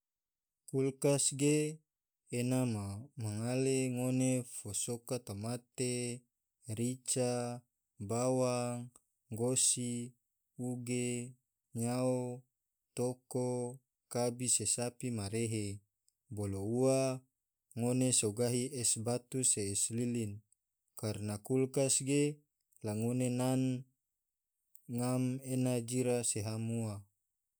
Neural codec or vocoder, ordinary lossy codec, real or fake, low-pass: none; none; real; none